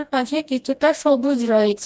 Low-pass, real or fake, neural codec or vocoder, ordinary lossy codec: none; fake; codec, 16 kHz, 1 kbps, FreqCodec, smaller model; none